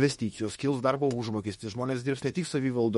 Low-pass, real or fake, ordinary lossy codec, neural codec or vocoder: 19.8 kHz; fake; MP3, 48 kbps; autoencoder, 48 kHz, 32 numbers a frame, DAC-VAE, trained on Japanese speech